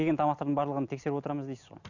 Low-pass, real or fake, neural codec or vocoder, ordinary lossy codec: 7.2 kHz; real; none; none